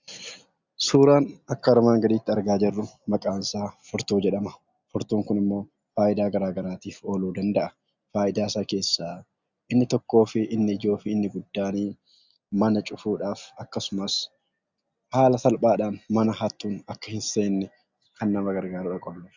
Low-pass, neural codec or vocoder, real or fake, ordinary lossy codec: 7.2 kHz; none; real; Opus, 64 kbps